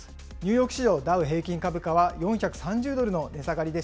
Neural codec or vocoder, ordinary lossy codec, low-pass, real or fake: none; none; none; real